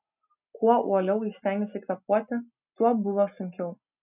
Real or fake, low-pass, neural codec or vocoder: real; 3.6 kHz; none